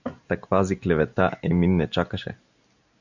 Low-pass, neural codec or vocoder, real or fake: 7.2 kHz; none; real